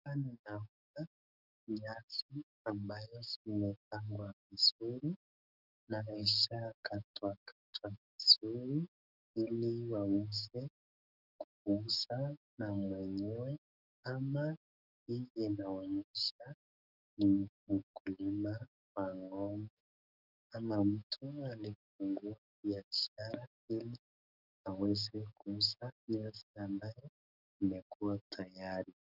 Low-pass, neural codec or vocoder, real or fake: 5.4 kHz; codec, 44.1 kHz, 7.8 kbps, DAC; fake